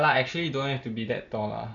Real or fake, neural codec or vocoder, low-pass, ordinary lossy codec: real; none; 7.2 kHz; none